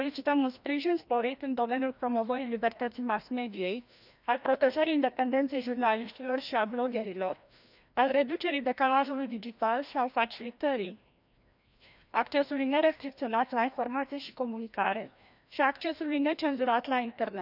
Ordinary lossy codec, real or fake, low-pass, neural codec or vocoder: none; fake; 5.4 kHz; codec, 16 kHz, 1 kbps, FreqCodec, larger model